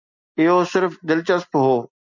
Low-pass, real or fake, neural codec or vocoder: 7.2 kHz; real; none